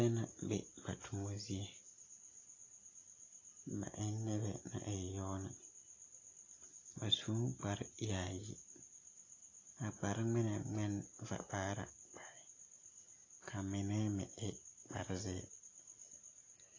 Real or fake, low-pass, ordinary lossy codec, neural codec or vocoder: real; 7.2 kHz; AAC, 32 kbps; none